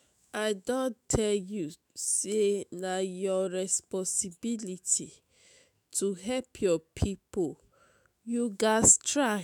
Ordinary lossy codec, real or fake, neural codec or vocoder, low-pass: none; fake; autoencoder, 48 kHz, 128 numbers a frame, DAC-VAE, trained on Japanese speech; none